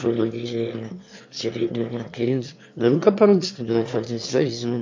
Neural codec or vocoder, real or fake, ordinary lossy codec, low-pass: autoencoder, 22.05 kHz, a latent of 192 numbers a frame, VITS, trained on one speaker; fake; MP3, 48 kbps; 7.2 kHz